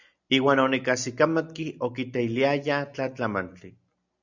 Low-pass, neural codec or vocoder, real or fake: 7.2 kHz; none; real